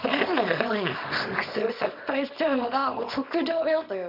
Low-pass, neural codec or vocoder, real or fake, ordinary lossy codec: 5.4 kHz; codec, 24 kHz, 0.9 kbps, WavTokenizer, small release; fake; none